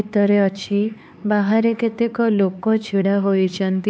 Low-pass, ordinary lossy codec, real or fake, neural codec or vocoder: none; none; fake; codec, 16 kHz, 4 kbps, X-Codec, HuBERT features, trained on LibriSpeech